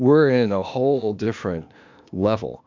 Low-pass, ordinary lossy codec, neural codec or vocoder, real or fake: 7.2 kHz; MP3, 64 kbps; codec, 16 kHz, 0.8 kbps, ZipCodec; fake